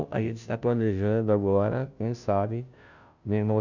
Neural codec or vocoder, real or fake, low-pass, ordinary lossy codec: codec, 16 kHz, 0.5 kbps, FunCodec, trained on Chinese and English, 25 frames a second; fake; 7.2 kHz; none